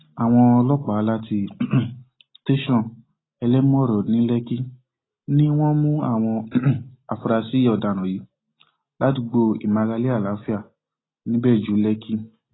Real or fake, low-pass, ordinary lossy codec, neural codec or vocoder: real; 7.2 kHz; AAC, 16 kbps; none